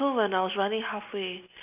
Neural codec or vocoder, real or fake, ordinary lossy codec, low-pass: none; real; none; 3.6 kHz